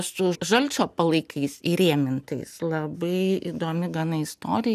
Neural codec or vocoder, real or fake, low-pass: none; real; 14.4 kHz